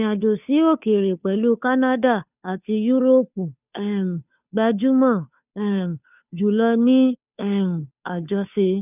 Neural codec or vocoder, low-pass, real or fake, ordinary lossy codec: codec, 16 kHz, 2 kbps, FunCodec, trained on Chinese and English, 25 frames a second; 3.6 kHz; fake; none